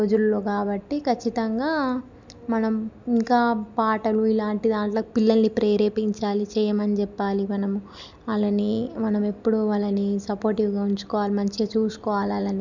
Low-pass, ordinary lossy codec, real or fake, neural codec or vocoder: 7.2 kHz; none; real; none